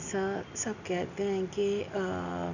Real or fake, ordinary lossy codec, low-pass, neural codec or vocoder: real; none; 7.2 kHz; none